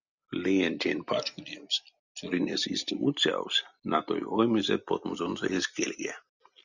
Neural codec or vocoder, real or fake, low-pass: none; real; 7.2 kHz